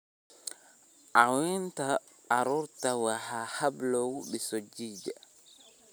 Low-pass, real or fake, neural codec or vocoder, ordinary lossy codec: none; real; none; none